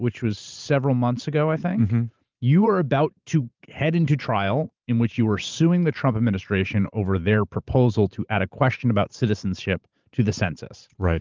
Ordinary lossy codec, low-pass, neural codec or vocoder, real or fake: Opus, 32 kbps; 7.2 kHz; vocoder, 44.1 kHz, 128 mel bands every 512 samples, BigVGAN v2; fake